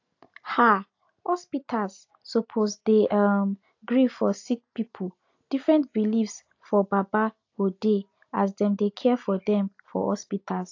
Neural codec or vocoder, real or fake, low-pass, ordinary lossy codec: none; real; 7.2 kHz; AAC, 48 kbps